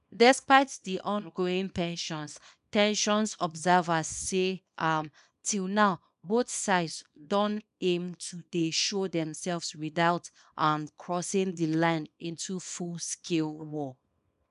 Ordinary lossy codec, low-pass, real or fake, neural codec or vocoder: none; 10.8 kHz; fake; codec, 24 kHz, 0.9 kbps, WavTokenizer, small release